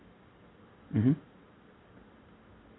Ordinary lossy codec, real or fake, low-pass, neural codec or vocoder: AAC, 16 kbps; real; 7.2 kHz; none